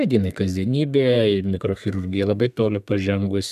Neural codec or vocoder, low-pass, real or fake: codec, 32 kHz, 1.9 kbps, SNAC; 14.4 kHz; fake